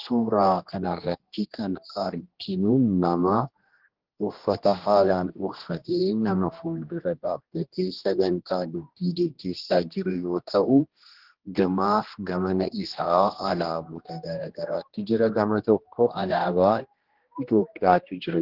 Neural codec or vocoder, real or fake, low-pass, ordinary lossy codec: codec, 16 kHz, 1 kbps, X-Codec, HuBERT features, trained on general audio; fake; 5.4 kHz; Opus, 16 kbps